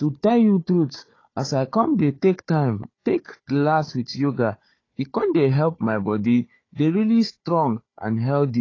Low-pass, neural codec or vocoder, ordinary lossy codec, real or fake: 7.2 kHz; codec, 16 kHz, 4 kbps, FunCodec, trained on Chinese and English, 50 frames a second; AAC, 32 kbps; fake